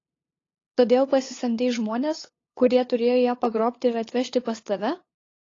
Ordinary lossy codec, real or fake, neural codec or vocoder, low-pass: AAC, 32 kbps; fake; codec, 16 kHz, 8 kbps, FunCodec, trained on LibriTTS, 25 frames a second; 7.2 kHz